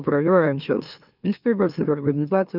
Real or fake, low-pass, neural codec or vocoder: fake; 5.4 kHz; autoencoder, 44.1 kHz, a latent of 192 numbers a frame, MeloTTS